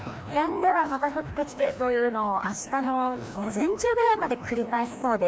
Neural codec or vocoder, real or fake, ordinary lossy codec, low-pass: codec, 16 kHz, 1 kbps, FreqCodec, larger model; fake; none; none